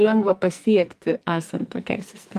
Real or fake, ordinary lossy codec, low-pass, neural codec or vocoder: fake; Opus, 32 kbps; 14.4 kHz; codec, 44.1 kHz, 2.6 kbps, SNAC